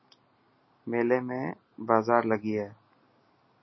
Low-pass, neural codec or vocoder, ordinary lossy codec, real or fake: 7.2 kHz; none; MP3, 24 kbps; real